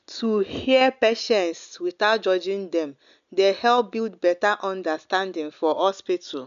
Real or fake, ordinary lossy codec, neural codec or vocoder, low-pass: real; none; none; 7.2 kHz